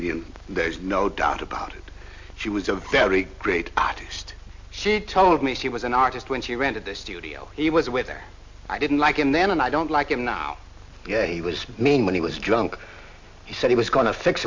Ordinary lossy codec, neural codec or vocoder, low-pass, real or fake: MP3, 48 kbps; none; 7.2 kHz; real